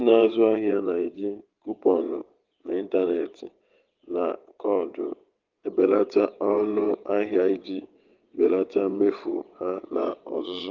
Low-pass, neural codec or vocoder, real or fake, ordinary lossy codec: 7.2 kHz; vocoder, 22.05 kHz, 80 mel bands, WaveNeXt; fake; Opus, 32 kbps